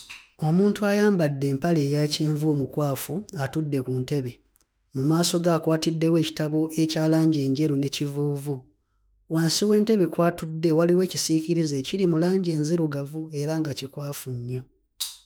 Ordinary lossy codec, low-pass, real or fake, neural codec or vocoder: none; none; fake; autoencoder, 48 kHz, 32 numbers a frame, DAC-VAE, trained on Japanese speech